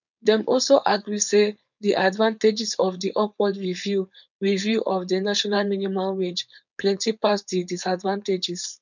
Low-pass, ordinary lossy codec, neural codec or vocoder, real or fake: 7.2 kHz; none; codec, 16 kHz, 4.8 kbps, FACodec; fake